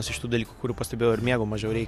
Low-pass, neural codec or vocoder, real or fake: 14.4 kHz; none; real